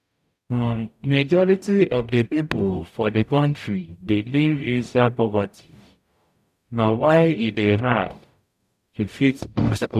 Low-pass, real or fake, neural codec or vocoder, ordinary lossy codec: 14.4 kHz; fake; codec, 44.1 kHz, 0.9 kbps, DAC; none